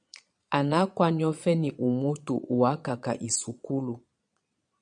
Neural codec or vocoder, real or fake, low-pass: vocoder, 22.05 kHz, 80 mel bands, Vocos; fake; 9.9 kHz